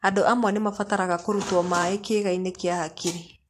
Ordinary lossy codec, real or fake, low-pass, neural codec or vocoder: AAC, 64 kbps; fake; 14.4 kHz; vocoder, 44.1 kHz, 128 mel bands every 512 samples, BigVGAN v2